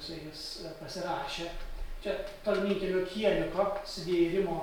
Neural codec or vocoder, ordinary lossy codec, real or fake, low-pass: none; MP3, 96 kbps; real; 19.8 kHz